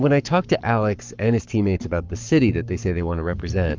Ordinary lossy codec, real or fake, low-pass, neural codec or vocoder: Opus, 24 kbps; fake; 7.2 kHz; codec, 44.1 kHz, 7.8 kbps, Pupu-Codec